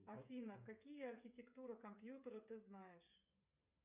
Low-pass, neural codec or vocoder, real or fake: 3.6 kHz; codec, 16 kHz, 8 kbps, FreqCodec, smaller model; fake